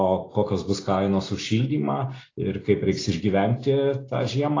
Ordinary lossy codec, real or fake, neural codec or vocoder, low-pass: AAC, 32 kbps; real; none; 7.2 kHz